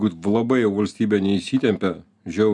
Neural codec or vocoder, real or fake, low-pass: none; real; 10.8 kHz